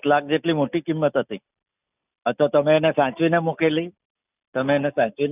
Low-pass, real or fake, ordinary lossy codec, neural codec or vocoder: 3.6 kHz; real; none; none